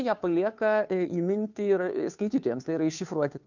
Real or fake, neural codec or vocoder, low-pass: fake; codec, 16 kHz, 2 kbps, FunCodec, trained on Chinese and English, 25 frames a second; 7.2 kHz